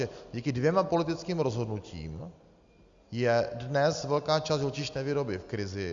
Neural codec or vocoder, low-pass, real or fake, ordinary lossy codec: none; 7.2 kHz; real; Opus, 64 kbps